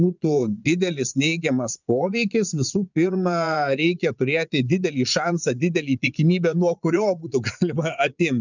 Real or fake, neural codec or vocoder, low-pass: fake; vocoder, 22.05 kHz, 80 mel bands, Vocos; 7.2 kHz